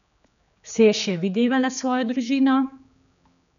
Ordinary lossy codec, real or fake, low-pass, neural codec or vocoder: none; fake; 7.2 kHz; codec, 16 kHz, 4 kbps, X-Codec, HuBERT features, trained on general audio